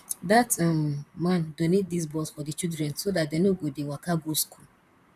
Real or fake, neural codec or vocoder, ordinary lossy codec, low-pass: fake; vocoder, 44.1 kHz, 128 mel bands, Pupu-Vocoder; none; 14.4 kHz